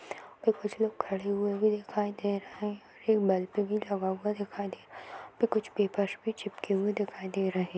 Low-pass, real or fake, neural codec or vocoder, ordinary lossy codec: none; real; none; none